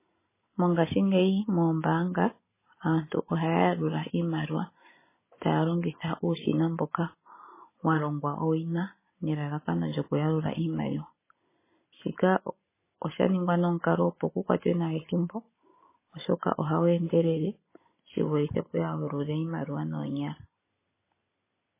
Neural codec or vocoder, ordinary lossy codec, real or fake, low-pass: none; MP3, 16 kbps; real; 3.6 kHz